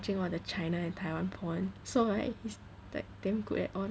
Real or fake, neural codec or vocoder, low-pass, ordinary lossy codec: real; none; none; none